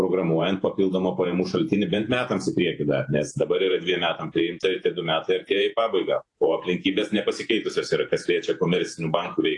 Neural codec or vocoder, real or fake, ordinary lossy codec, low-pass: vocoder, 48 kHz, 128 mel bands, Vocos; fake; AAC, 48 kbps; 10.8 kHz